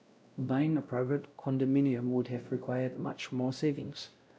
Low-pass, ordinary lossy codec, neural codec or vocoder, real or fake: none; none; codec, 16 kHz, 0.5 kbps, X-Codec, WavLM features, trained on Multilingual LibriSpeech; fake